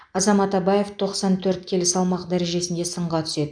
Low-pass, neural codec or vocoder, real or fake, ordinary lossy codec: 9.9 kHz; none; real; none